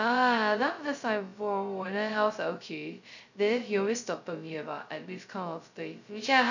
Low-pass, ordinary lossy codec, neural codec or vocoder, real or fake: 7.2 kHz; none; codec, 16 kHz, 0.2 kbps, FocalCodec; fake